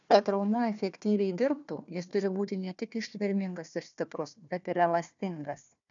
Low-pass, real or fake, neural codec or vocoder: 7.2 kHz; fake; codec, 16 kHz, 1 kbps, FunCodec, trained on Chinese and English, 50 frames a second